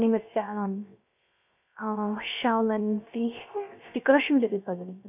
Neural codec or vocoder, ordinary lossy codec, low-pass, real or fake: codec, 16 kHz, 0.3 kbps, FocalCodec; none; 3.6 kHz; fake